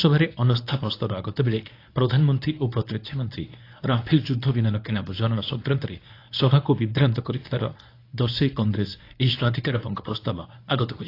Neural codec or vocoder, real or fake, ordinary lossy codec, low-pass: codec, 24 kHz, 0.9 kbps, WavTokenizer, medium speech release version 1; fake; AAC, 32 kbps; 5.4 kHz